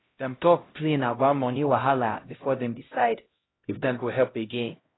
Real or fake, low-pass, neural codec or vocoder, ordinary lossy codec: fake; 7.2 kHz; codec, 16 kHz, 0.5 kbps, X-Codec, HuBERT features, trained on LibriSpeech; AAC, 16 kbps